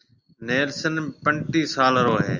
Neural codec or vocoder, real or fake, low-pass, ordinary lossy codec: none; real; 7.2 kHz; Opus, 64 kbps